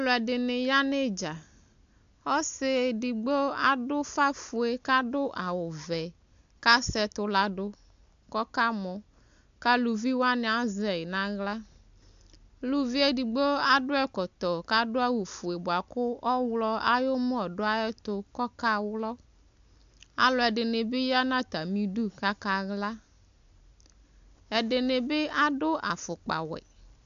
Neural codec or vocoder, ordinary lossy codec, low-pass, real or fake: none; AAC, 64 kbps; 7.2 kHz; real